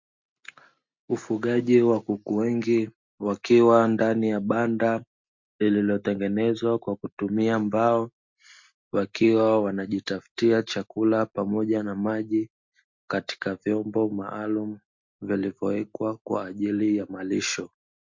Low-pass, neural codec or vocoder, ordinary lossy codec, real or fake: 7.2 kHz; none; MP3, 48 kbps; real